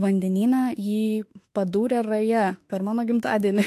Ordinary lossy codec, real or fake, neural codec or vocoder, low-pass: AAC, 64 kbps; fake; autoencoder, 48 kHz, 32 numbers a frame, DAC-VAE, trained on Japanese speech; 14.4 kHz